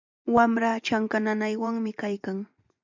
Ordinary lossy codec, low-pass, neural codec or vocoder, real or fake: MP3, 64 kbps; 7.2 kHz; vocoder, 24 kHz, 100 mel bands, Vocos; fake